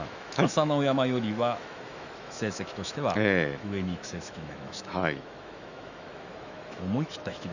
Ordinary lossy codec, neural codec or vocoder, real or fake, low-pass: none; autoencoder, 48 kHz, 128 numbers a frame, DAC-VAE, trained on Japanese speech; fake; 7.2 kHz